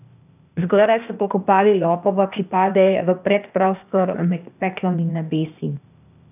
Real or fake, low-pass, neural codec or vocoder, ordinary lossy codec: fake; 3.6 kHz; codec, 16 kHz, 0.8 kbps, ZipCodec; none